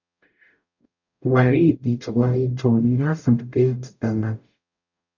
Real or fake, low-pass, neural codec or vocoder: fake; 7.2 kHz; codec, 44.1 kHz, 0.9 kbps, DAC